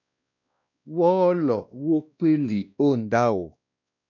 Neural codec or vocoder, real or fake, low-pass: codec, 16 kHz, 1 kbps, X-Codec, WavLM features, trained on Multilingual LibriSpeech; fake; 7.2 kHz